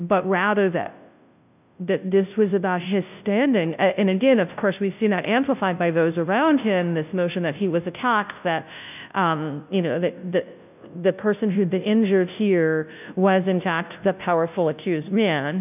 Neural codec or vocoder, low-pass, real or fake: codec, 16 kHz, 0.5 kbps, FunCodec, trained on Chinese and English, 25 frames a second; 3.6 kHz; fake